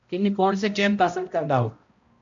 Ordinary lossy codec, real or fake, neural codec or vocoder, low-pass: MP3, 48 kbps; fake; codec, 16 kHz, 0.5 kbps, X-Codec, HuBERT features, trained on balanced general audio; 7.2 kHz